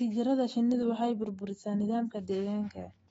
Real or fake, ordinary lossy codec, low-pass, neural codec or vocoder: fake; AAC, 24 kbps; 19.8 kHz; autoencoder, 48 kHz, 128 numbers a frame, DAC-VAE, trained on Japanese speech